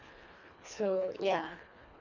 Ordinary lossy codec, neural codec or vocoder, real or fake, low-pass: none; codec, 24 kHz, 1.5 kbps, HILCodec; fake; 7.2 kHz